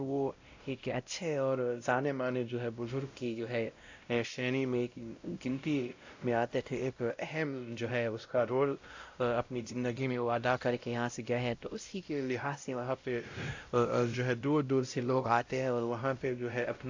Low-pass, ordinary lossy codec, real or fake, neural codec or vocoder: 7.2 kHz; AAC, 48 kbps; fake; codec, 16 kHz, 0.5 kbps, X-Codec, WavLM features, trained on Multilingual LibriSpeech